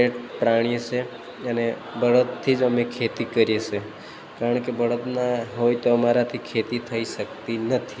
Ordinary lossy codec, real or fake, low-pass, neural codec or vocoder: none; real; none; none